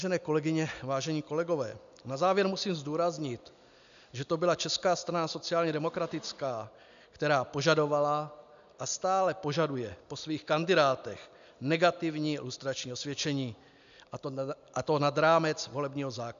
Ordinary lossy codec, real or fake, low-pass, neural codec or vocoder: AAC, 96 kbps; real; 7.2 kHz; none